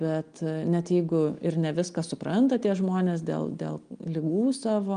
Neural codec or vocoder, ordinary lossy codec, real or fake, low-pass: none; Opus, 32 kbps; real; 9.9 kHz